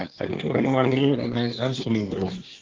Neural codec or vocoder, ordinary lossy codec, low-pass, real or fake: codec, 24 kHz, 1 kbps, SNAC; Opus, 16 kbps; 7.2 kHz; fake